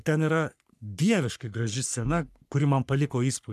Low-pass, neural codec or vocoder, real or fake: 14.4 kHz; codec, 44.1 kHz, 3.4 kbps, Pupu-Codec; fake